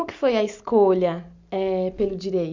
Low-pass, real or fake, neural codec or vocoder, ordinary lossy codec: 7.2 kHz; real; none; MP3, 64 kbps